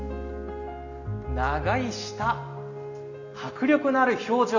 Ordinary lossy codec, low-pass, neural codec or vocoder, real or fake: none; 7.2 kHz; none; real